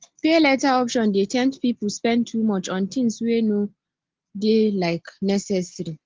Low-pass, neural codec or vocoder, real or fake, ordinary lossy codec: 7.2 kHz; none; real; Opus, 16 kbps